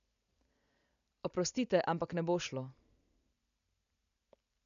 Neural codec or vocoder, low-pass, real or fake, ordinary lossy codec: none; 7.2 kHz; real; AAC, 96 kbps